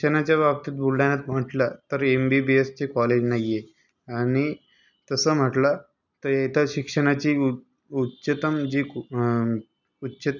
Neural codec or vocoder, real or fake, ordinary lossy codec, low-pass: none; real; none; 7.2 kHz